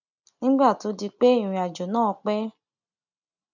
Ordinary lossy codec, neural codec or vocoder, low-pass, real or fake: none; none; 7.2 kHz; real